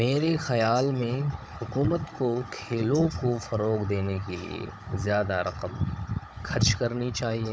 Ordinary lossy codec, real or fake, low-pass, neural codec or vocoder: none; fake; none; codec, 16 kHz, 16 kbps, FunCodec, trained on Chinese and English, 50 frames a second